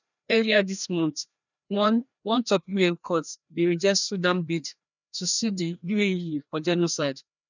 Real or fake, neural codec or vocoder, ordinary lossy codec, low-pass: fake; codec, 16 kHz, 1 kbps, FreqCodec, larger model; none; 7.2 kHz